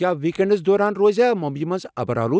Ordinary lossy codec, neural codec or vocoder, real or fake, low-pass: none; none; real; none